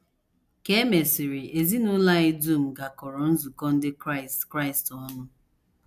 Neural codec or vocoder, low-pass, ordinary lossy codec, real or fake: none; 14.4 kHz; none; real